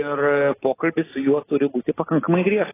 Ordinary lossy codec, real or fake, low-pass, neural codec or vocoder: AAC, 16 kbps; real; 3.6 kHz; none